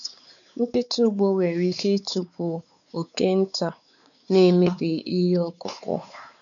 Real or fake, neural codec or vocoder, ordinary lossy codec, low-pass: fake; codec, 16 kHz, 4 kbps, X-Codec, WavLM features, trained on Multilingual LibriSpeech; none; 7.2 kHz